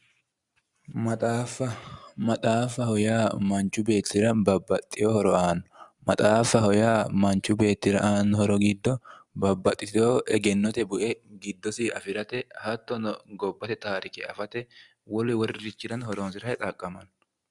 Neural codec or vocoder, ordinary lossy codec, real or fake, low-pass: none; Opus, 64 kbps; real; 10.8 kHz